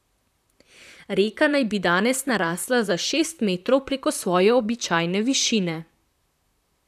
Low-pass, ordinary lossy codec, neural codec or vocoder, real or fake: 14.4 kHz; none; vocoder, 44.1 kHz, 128 mel bands, Pupu-Vocoder; fake